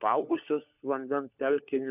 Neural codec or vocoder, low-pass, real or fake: codec, 16 kHz, 4 kbps, FunCodec, trained on LibriTTS, 50 frames a second; 3.6 kHz; fake